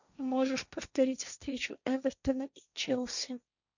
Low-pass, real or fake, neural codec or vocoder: 7.2 kHz; fake; codec, 16 kHz, 1.1 kbps, Voila-Tokenizer